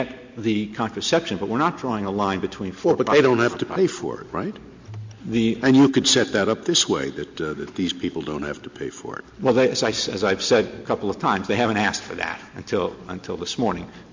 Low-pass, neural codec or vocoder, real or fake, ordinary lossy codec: 7.2 kHz; none; real; MP3, 48 kbps